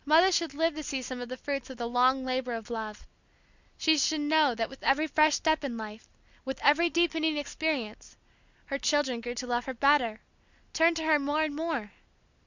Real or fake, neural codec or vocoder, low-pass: real; none; 7.2 kHz